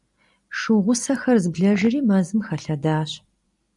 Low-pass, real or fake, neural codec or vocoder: 10.8 kHz; fake; vocoder, 44.1 kHz, 128 mel bands every 256 samples, BigVGAN v2